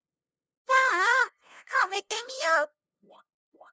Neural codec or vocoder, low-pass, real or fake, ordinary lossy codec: codec, 16 kHz, 0.5 kbps, FunCodec, trained on LibriTTS, 25 frames a second; none; fake; none